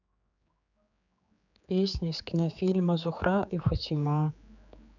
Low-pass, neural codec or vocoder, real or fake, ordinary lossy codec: 7.2 kHz; codec, 16 kHz, 4 kbps, X-Codec, HuBERT features, trained on general audio; fake; none